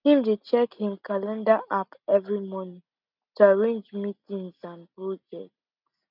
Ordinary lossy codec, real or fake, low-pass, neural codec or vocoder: none; real; 5.4 kHz; none